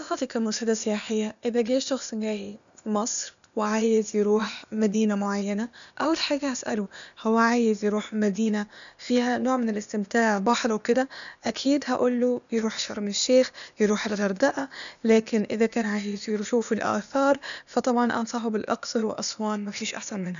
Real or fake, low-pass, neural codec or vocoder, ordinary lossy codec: fake; 7.2 kHz; codec, 16 kHz, 0.8 kbps, ZipCodec; none